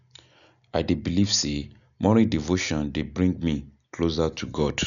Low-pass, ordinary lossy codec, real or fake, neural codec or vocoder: 7.2 kHz; none; real; none